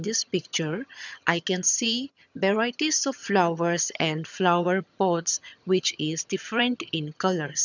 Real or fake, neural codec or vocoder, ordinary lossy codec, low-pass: fake; vocoder, 22.05 kHz, 80 mel bands, HiFi-GAN; none; 7.2 kHz